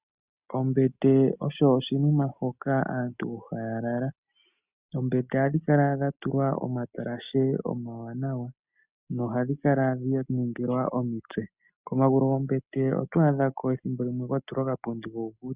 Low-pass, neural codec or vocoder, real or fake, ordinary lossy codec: 3.6 kHz; none; real; Opus, 64 kbps